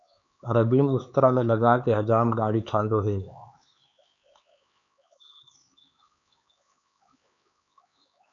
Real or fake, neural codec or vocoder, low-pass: fake; codec, 16 kHz, 4 kbps, X-Codec, HuBERT features, trained on LibriSpeech; 7.2 kHz